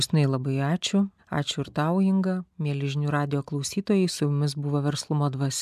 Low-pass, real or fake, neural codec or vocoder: 14.4 kHz; real; none